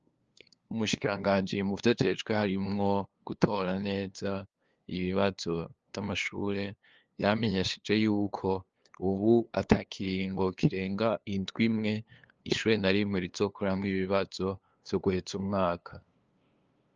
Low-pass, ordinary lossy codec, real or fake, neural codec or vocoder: 7.2 kHz; Opus, 24 kbps; fake; codec, 16 kHz, 2 kbps, FunCodec, trained on LibriTTS, 25 frames a second